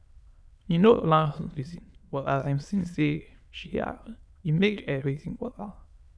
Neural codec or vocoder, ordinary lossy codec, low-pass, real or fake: autoencoder, 22.05 kHz, a latent of 192 numbers a frame, VITS, trained on many speakers; none; none; fake